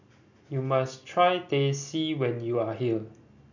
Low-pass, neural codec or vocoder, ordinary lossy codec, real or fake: 7.2 kHz; none; none; real